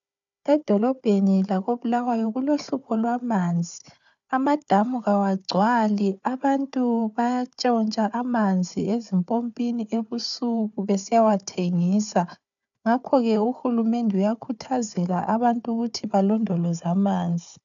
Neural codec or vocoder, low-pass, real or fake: codec, 16 kHz, 4 kbps, FunCodec, trained on Chinese and English, 50 frames a second; 7.2 kHz; fake